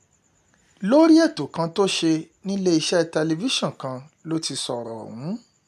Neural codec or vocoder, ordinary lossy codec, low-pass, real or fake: none; none; 14.4 kHz; real